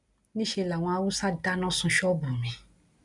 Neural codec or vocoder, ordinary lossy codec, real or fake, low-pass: none; none; real; 10.8 kHz